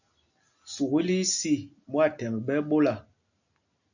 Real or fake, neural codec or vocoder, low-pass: real; none; 7.2 kHz